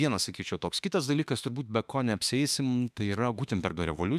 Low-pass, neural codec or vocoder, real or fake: 14.4 kHz; autoencoder, 48 kHz, 32 numbers a frame, DAC-VAE, trained on Japanese speech; fake